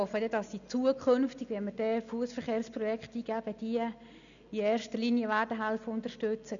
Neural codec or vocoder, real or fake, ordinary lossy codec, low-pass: none; real; none; 7.2 kHz